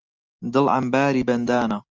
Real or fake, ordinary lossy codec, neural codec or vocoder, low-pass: real; Opus, 24 kbps; none; 7.2 kHz